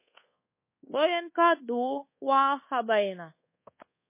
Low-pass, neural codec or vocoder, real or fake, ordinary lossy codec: 3.6 kHz; codec, 24 kHz, 1.2 kbps, DualCodec; fake; MP3, 24 kbps